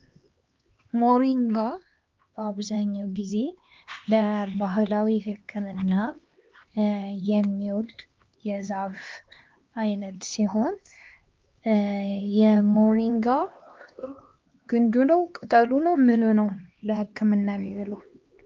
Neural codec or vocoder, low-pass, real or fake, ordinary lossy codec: codec, 16 kHz, 2 kbps, X-Codec, HuBERT features, trained on LibriSpeech; 7.2 kHz; fake; Opus, 32 kbps